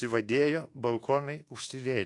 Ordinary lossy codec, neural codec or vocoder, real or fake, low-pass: AAC, 48 kbps; codec, 24 kHz, 1.2 kbps, DualCodec; fake; 10.8 kHz